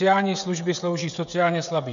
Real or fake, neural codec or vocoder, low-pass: fake; codec, 16 kHz, 16 kbps, FreqCodec, smaller model; 7.2 kHz